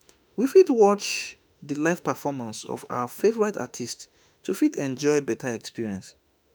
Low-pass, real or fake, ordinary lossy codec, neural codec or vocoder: none; fake; none; autoencoder, 48 kHz, 32 numbers a frame, DAC-VAE, trained on Japanese speech